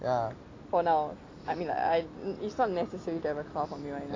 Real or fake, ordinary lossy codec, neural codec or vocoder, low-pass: real; AAC, 32 kbps; none; 7.2 kHz